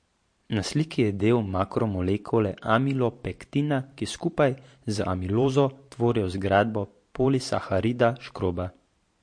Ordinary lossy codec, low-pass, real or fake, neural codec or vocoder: MP3, 48 kbps; 9.9 kHz; fake; vocoder, 44.1 kHz, 128 mel bands every 512 samples, BigVGAN v2